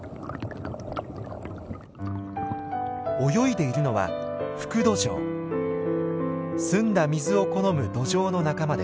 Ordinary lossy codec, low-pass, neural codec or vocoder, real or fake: none; none; none; real